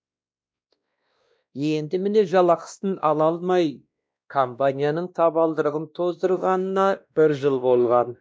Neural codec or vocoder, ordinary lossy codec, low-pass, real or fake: codec, 16 kHz, 1 kbps, X-Codec, WavLM features, trained on Multilingual LibriSpeech; none; none; fake